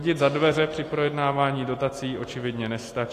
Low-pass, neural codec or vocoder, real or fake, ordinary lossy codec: 14.4 kHz; none; real; AAC, 48 kbps